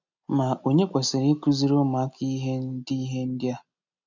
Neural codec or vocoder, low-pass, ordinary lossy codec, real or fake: none; 7.2 kHz; none; real